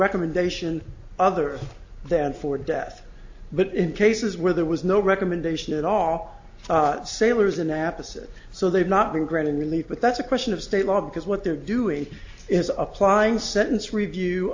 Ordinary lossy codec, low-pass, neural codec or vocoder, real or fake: AAC, 48 kbps; 7.2 kHz; none; real